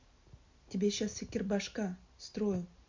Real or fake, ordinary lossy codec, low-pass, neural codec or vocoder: real; MP3, 64 kbps; 7.2 kHz; none